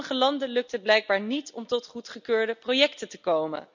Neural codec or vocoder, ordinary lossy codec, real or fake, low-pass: none; none; real; 7.2 kHz